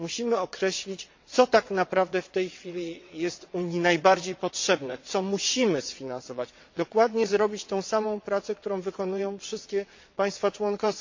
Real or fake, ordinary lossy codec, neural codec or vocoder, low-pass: fake; MP3, 48 kbps; vocoder, 22.05 kHz, 80 mel bands, WaveNeXt; 7.2 kHz